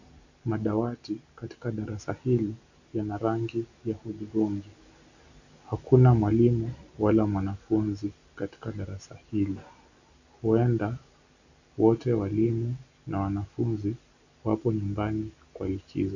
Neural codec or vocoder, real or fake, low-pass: none; real; 7.2 kHz